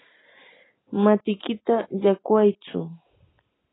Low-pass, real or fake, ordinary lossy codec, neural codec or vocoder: 7.2 kHz; real; AAC, 16 kbps; none